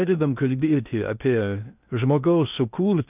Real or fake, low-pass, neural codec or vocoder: fake; 3.6 kHz; codec, 16 kHz in and 24 kHz out, 0.6 kbps, FocalCodec, streaming, 4096 codes